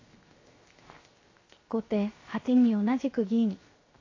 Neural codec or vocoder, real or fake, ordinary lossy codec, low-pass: codec, 16 kHz, 0.7 kbps, FocalCodec; fake; AAC, 32 kbps; 7.2 kHz